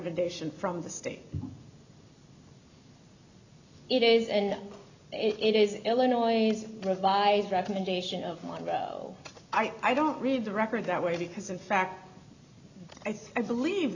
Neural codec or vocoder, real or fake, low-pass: none; real; 7.2 kHz